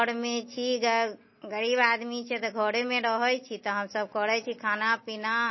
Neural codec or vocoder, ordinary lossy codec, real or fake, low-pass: none; MP3, 24 kbps; real; 7.2 kHz